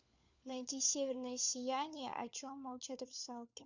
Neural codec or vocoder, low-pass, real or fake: codec, 16 kHz, 4 kbps, FunCodec, trained on LibriTTS, 50 frames a second; 7.2 kHz; fake